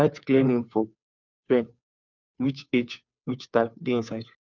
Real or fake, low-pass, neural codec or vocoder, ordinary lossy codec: fake; 7.2 kHz; codec, 16 kHz, 2 kbps, FunCodec, trained on Chinese and English, 25 frames a second; none